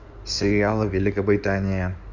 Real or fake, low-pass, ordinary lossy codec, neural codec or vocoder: fake; 7.2 kHz; Opus, 64 kbps; codec, 16 kHz in and 24 kHz out, 2.2 kbps, FireRedTTS-2 codec